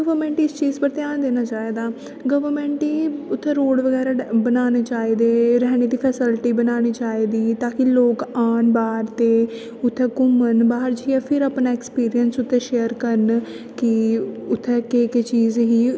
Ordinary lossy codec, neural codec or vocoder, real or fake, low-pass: none; none; real; none